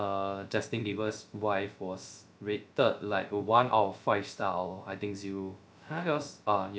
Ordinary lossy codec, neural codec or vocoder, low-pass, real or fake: none; codec, 16 kHz, 0.3 kbps, FocalCodec; none; fake